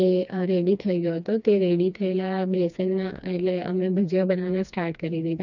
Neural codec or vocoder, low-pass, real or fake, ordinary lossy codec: codec, 16 kHz, 2 kbps, FreqCodec, smaller model; 7.2 kHz; fake; none